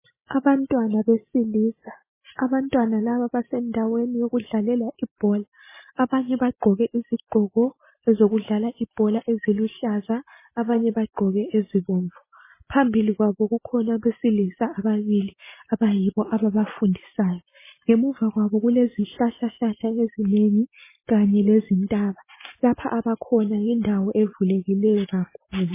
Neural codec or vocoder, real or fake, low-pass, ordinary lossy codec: none; real; 3.6 kHz; MP3, 16 kbps